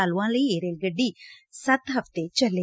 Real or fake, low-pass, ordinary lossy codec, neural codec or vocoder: real; none; none; none